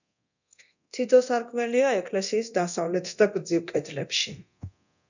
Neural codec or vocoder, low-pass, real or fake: codec, 24 kHz, 0.9 kbps, DualCodec; 7.2 kHz; fake